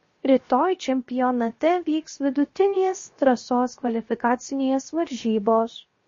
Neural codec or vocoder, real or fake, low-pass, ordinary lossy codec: codec, 16 kHz, 0.7 kbps, FocalCodec; fake; 7.2 kHz; MP3, 32 kbps